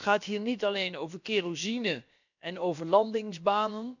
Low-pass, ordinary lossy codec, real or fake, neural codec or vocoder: 7.2 kHz; none; fake; codec, 16 kHz, about 1 kbps, DyCAST, with the encoder's durations